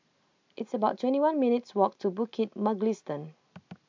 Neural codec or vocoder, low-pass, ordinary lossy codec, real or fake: none; 7.2 kHz; MP3, 64 kbps; real